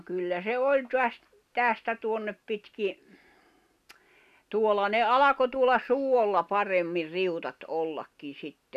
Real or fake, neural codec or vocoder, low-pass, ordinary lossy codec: real; none; 14.4 kHz; none